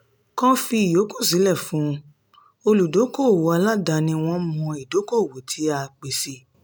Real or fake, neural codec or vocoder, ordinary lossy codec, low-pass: real; none; none; none